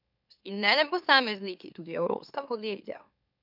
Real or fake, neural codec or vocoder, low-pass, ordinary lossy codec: fake; autoencoder, 44.1 kHz, a latent of 192 numbers a frame, MeloTTS; 5.4 kHz; none